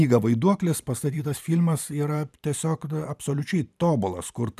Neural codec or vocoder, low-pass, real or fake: vocoder, 44.1 kHz, 128 mel bands every 512 samples, BigVGAN v2; 14.4 kHz; fake